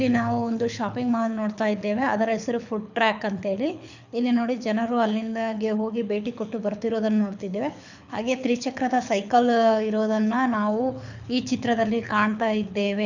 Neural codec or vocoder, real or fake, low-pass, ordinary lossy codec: codec, 24 kHz, 6 kbps, HILCodec; fake; 7.2 kHz; none